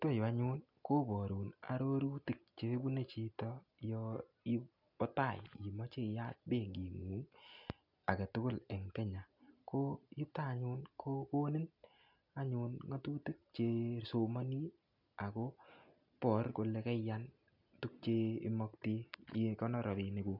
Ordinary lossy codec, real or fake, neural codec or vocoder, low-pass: none; real; none; 5.4 kHz